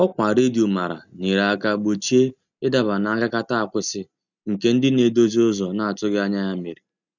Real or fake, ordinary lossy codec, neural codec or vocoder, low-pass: real; none; none; 7.2 kHz